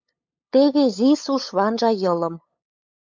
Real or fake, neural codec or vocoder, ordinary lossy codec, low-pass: fake; codec, 16 kHz, 8 kbps, FunCodec, trained on LibriTTS, 25 frames a second; MP3, 64 kbps; 7.2 kHz